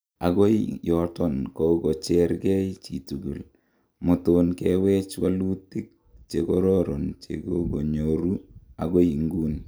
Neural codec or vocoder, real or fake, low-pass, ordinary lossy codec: none; real; none; none